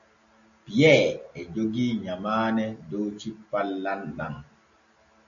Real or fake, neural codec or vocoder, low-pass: real; none; 7.2 kHz